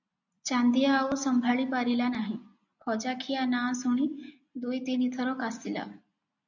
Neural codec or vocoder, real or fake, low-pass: none; real; 7.2 kHz